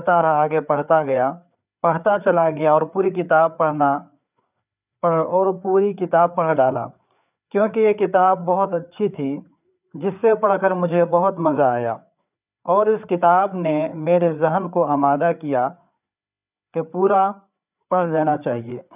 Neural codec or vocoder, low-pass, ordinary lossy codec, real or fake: codec, 16 kHz, 4 kbps, FreqCodec, larger model; 3.6 kHz; none; fake